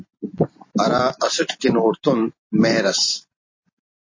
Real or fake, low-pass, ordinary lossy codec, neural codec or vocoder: fake; 7.2 kHz; MP3, 32 kbps; vocoder, 44.1 kHz, 128 mel bands every 256 samples, BigVGAN v2